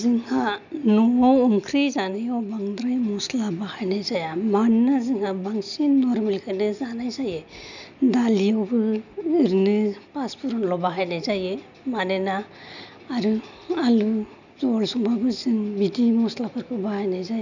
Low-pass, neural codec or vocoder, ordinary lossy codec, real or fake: 7.2 kHz; none; none; real